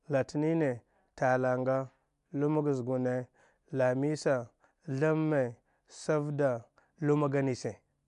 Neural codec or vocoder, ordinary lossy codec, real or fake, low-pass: none; MP3, 64 kbps; real; 10.8 kHz